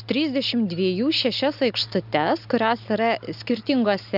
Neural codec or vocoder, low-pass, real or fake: none; 5.4 kHz; real